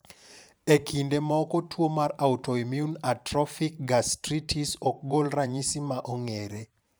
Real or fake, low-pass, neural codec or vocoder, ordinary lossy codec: fake; none; vocoder, 44.1 kHz, 128 mel bands every 512 samples, BigVGAN v2; none